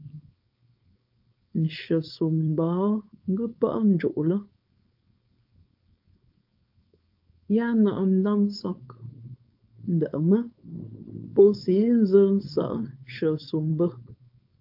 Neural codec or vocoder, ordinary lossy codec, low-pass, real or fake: codec, 16 kHz, 4.8 kbps, FACodec; AAC, 48 kbps; 5.4 kHz; fake